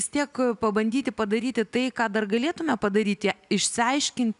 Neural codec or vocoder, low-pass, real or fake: none; 10.8 kHz; real